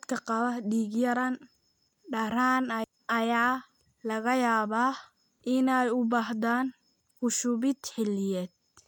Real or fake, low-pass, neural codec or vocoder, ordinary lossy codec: real; 19.8 kHz; none; none